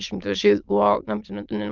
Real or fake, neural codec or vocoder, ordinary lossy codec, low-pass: fake; autoencoder, 22.05 kHz, a latent of 192 numbers a frame, VITS, trained on many speakers; Opus, 24 kbps; 7.2 kHz